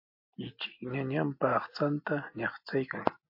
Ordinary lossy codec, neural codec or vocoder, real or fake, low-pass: MP3, 32 kbps; none; real; 5.4 kHz